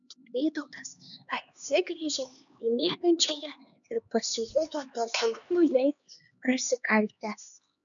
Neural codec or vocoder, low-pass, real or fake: codec, 16 kHz, 2 kbps, X-Codec, HuBERT features, trained on LibriSpeech; 7.2 kHz; fake